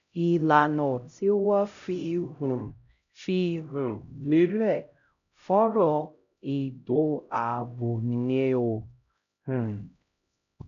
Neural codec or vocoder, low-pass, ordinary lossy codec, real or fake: codec, 16 kHz, 0.5 kbps, X-Codec, HuBERT features, trained on LibriSpeech; 7.2 kHz; none; fake